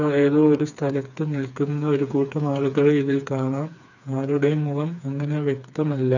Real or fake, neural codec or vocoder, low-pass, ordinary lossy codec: fake; codec, 16 kHz, 4 kbps, FreqCodec, smaller model; 7.2 kHz; none